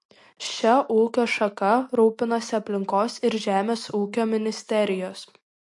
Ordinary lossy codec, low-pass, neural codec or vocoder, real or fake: MP3, 48 kbps; 10.8 kHz; none; real